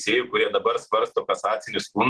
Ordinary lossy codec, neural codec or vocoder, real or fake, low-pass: Opus, 16 kbps; none; real; 10.8 kHz